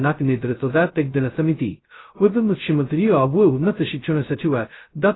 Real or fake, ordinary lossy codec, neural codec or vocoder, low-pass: fake; AAC, 16 kbps; codec, 16 kHz, 0.2 kbps, FocalCodec; 7.2 kHz